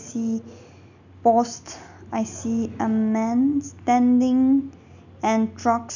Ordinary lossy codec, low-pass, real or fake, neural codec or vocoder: none; 7.2 kHz; real; none